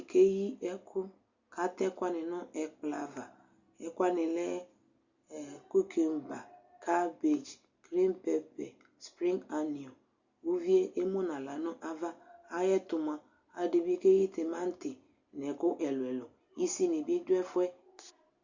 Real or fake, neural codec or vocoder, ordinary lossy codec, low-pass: real; none; Opus, 64 kbps; 7.2 kHz